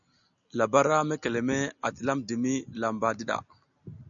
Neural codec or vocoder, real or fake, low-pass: none; real; 7.2 kHz